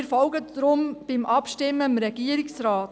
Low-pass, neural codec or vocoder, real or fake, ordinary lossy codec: none; none; real; none